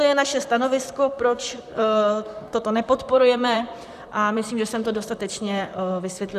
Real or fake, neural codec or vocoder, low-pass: fake; vocoder, 44.1 kHz, 128 mel bands, Pupu-Vocoder; 14.4 kHz